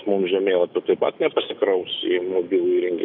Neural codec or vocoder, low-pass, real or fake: autoencoder, 48 kHz, 128 numbers a frame, DAC-VAE, trained on Japanese speech; 5.4 kHz; fake